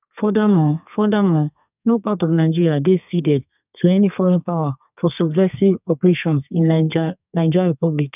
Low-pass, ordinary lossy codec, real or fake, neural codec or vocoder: 3.6 kHz; none; fake; codec, 32 kHz, 1.9 kbps, SNAC